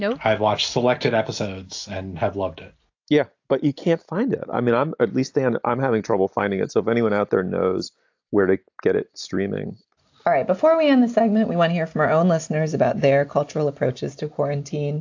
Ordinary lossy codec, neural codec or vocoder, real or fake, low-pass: AAC, 48 kbps; none; real; 7.2 kHz